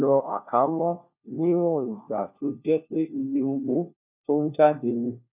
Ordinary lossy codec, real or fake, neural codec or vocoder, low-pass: none; fake; codec, 16 kHz, 1 kbps, FunCodec, trained on LibriTTS, 50 frames a second; 3.6 kHz